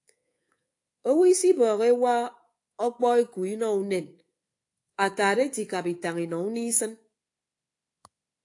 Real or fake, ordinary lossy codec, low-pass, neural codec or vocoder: fake; AAC, 48 kbps; 10.8 kHz; codec, 24 kHz, 3.1 kbps, DualCodec